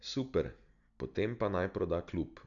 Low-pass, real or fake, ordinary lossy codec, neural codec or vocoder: 7.2 kHz; real; none; none